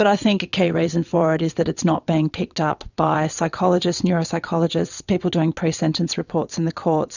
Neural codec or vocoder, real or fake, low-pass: vocoder, 22.05 kHz, 80 mel bands, WaveNeXt; fake; 7.2 kHz